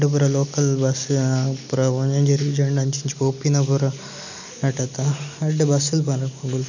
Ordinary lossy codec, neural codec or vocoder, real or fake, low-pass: none; none; real; 7.2 kHz